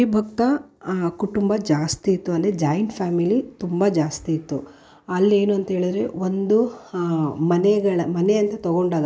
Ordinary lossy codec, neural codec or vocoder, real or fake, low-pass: none; none; real; none